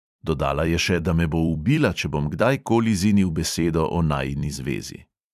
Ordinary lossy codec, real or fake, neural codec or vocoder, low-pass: none; fake; vocoder, 44.1 kHz, 128 mel bands every 256 samples, BigVGAN v2; 14.4 kHz